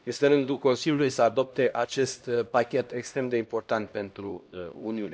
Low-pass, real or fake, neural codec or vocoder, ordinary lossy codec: none; fake; codec, 16 kHz, 1 kbps, X-Codec, HuBERT features, trained on LibriSpeech; none